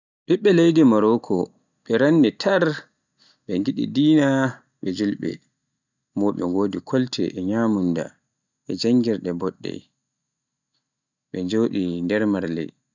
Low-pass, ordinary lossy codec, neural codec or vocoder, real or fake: 7.2 kHz; none; none; real